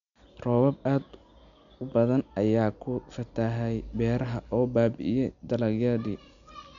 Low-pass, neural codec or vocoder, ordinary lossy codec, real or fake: 7.2 kHz; none; none; real